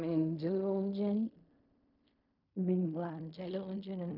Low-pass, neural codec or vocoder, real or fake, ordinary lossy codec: 5.4 kHz; codec, 16 kHz in and 24 kHz out, 0.4 kbps, LongCat-Audio-Codec, fine tuned four codebook decoder; fake; none